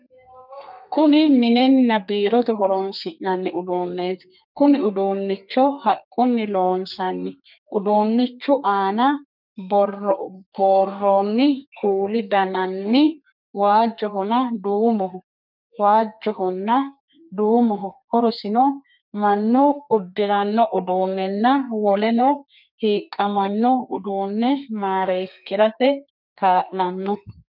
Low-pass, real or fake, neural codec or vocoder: 5.4 kHz; fake; codec, 32 kHz, 1.9 kbps, SNAC